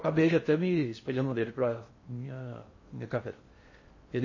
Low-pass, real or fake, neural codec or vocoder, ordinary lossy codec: 7.2 kHz; fake; codec, 16 kHz in and 24 kHz out, 0.6 kbps, FocalCodec, streaming, 4096 codes; MP3, 32 kbps